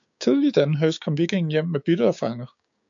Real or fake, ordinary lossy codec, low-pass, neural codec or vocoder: fake; AAC, 64 kbps; 7.2 kHz; codec, 16 kHz, 6 kbps, DAC